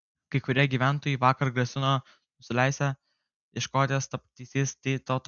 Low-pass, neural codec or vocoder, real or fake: 7.2 kHz; none; real